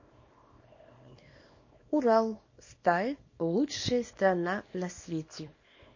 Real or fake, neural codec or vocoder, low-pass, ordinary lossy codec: fake; codec, 24 kHz, 0.9 kbps, WavTokenizer, small release; 7.2 kHz; MP3, 32 kbps